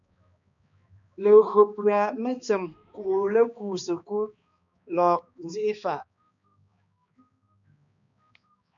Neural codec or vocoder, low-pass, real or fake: codec, 16 kHz, 2 kbps, X-Codec, HuBERT features, trained on balanced general audio; 7.2 kHz; fake